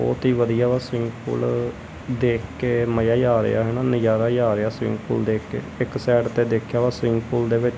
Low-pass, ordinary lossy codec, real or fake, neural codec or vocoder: none; none; real; none